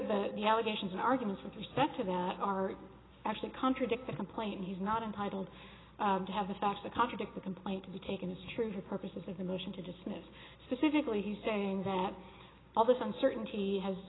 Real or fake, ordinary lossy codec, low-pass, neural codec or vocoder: real; AAC, 16 kbps; 7.2 kHz; none